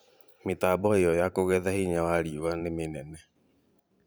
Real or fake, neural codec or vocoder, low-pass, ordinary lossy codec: fake; vocoder, 44.1 kHz, 128 mel bands every 512 samples, BigVGAN v2; none; none